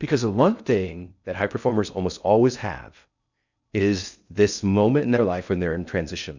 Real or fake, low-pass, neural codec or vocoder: fake; 7.2 kHz; codec, 16 kHz in and 24 kHz out, 0.6 kbps, FocalCodec, streaming, 2048 codes